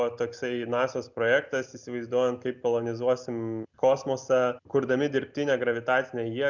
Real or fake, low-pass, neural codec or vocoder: real; 7.2 kHz; none